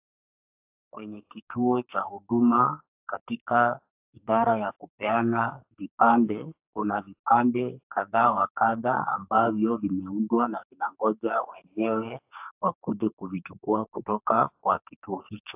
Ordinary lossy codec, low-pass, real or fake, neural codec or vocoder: AAC, 32 kbps; 3.6 kHz; fake; codec, 44.1 kHz, 2.6 kbps, SNAC